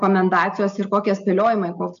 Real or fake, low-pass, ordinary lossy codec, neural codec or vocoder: real; 7.2 kHz; MP3, 64 kbps; none